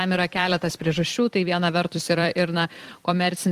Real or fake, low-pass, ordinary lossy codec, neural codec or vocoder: fake; 14.4 kHz; Opus, 24 kbps; vocoder, 44.1 kHz, 128 mel bands every 256 samples, BigVGAN v2